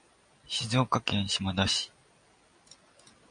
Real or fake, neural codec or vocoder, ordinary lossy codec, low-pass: real; none; MP3, 64 kbps; 9.9 kHz